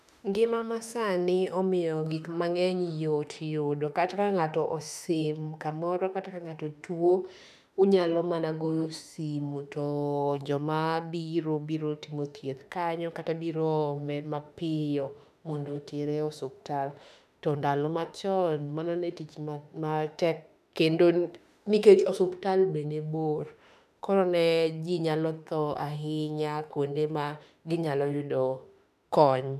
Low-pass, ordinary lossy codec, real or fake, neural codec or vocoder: 14.4 kHz; none; fake; autoencoder, 48 kHz, 32 numbers a frame, DAC-VAE, trained on Japanese speech